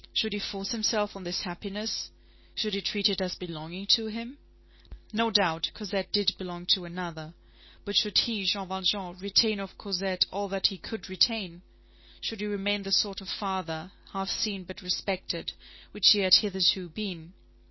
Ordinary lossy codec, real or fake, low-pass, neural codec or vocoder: MP3, 24 kbps; real; 7.2 kHz; none